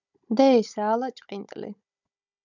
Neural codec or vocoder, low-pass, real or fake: codec, 16 kHz, 16 kbps, FunCodec, trained on Chinese and English, 50 frames a second; 7.2 kHz; fake